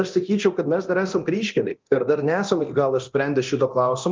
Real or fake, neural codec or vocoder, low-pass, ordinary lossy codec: fake; codec, 24 kHz, 0.9 kbps, DualCodec; 7.2 kHz; Opus, 24 kbps